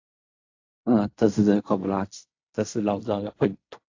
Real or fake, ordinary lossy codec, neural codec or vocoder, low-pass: fake; AAC, 48 kbps; codec, 16 kHz in and 24 kHz out, 0.4 kbps, LongCat-Audio-Codec, fine tuned four codebook decoder; 7.2 kHz